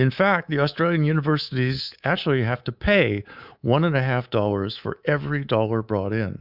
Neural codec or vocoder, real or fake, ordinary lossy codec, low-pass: codec, 24 kHz, 3.1 kbps, DualCodec; fake; Opus, 64 kbps; 5.4 kHz